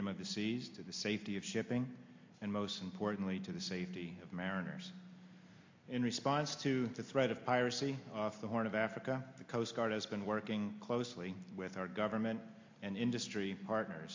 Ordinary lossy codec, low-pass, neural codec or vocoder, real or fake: MP3, 48 kbps; 7.2 kHz; none; real